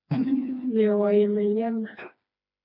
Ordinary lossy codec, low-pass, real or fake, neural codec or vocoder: AAC, 48 kbps; 5.4 kHz; fake; codec, 16 kHz, 2 kbps, FreqCodec, smaller model